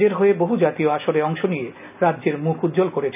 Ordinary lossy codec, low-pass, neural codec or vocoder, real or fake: none; 3.6 kHz; none; real